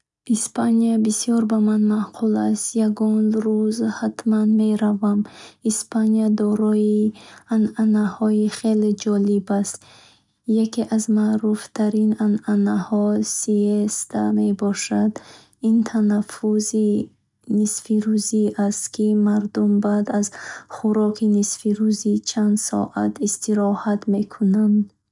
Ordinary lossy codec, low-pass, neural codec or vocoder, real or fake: none; 10.8 kHz; none; real